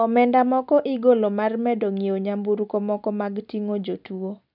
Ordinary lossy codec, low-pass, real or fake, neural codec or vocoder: none; 5.4 kHz; real; none